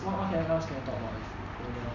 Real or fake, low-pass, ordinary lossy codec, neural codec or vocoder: real; 7.2 kHz; none; none